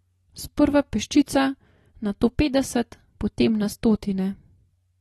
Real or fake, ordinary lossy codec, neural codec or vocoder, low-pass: real; AAC, 32 kbps; none; 19.8 kHz